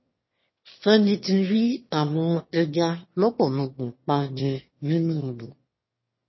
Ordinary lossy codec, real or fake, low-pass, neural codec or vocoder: MP3, 24 kbps; fake; 7.2 kHz; autoencoder, 22.05 kHz, a latent of 192 numbers a frame, VITS, trained on one speaker